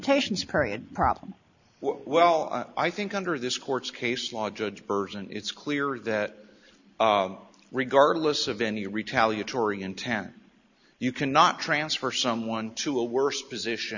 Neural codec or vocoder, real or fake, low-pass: none; real; 7.2 kHz